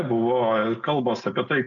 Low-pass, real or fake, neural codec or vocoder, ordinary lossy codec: 7.2 kHz; real; none; MP3, 64 kbps